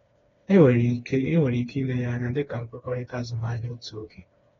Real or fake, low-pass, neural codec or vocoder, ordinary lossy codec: fake; 7.2 kHz; codec, 16 kHz, 2 kbps, FreqCodec, smaller model; AAC, 24 kbps